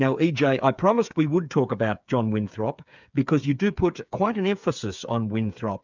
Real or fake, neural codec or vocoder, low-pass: fake; codec, 16 kHz, 8 kbps, FreqCodec, smaller model; 7.2 kHz